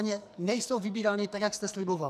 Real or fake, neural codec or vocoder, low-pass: fake; codec, 44.1 kHz, 2.6 kbps, SNAC; 14.4 kHz